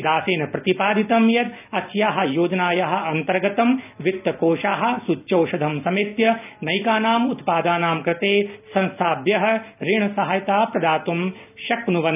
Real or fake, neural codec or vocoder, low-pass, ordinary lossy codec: real; none; 3.6 kHz; none